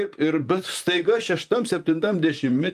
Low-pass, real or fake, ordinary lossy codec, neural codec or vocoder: 14.4 kHz; fake; Opus, 32 kbps; vocoder, 44.1 kHz, 128 mel bands, Pupu-Vocoder